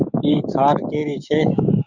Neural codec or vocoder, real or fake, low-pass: codec, 16 kHz, 6 kbps, DAC; fake; 7.2 kHz